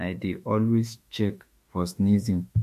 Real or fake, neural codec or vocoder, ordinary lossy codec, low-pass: fake; autoencoder, 48 kHz, 32 numbers a frame, DAC-VAE, trained on Japanese speech; MP3, 64 kbps; 14.4 kHz